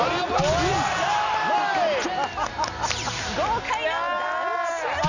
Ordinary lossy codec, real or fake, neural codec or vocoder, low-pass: none; real; none; 7.2 kHz